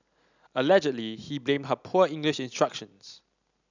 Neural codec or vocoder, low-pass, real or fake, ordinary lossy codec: none; 7.2 kHz; real; none